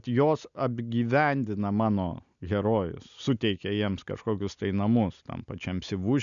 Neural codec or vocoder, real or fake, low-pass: none; real; 7.2 kHz